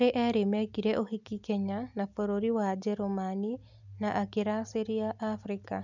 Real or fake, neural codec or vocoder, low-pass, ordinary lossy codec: real; none; 7.2 kHz; none